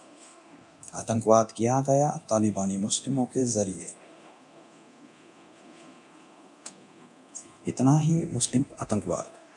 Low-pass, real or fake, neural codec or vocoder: 10.8 kHz; fake; codec, 24 kHz, 0.9 kbps, DualCodec